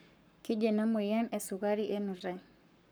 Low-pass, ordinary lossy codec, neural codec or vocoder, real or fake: none; none; codec, 44.1 kHz, 7.8 kbps, Pupu-Codec; fake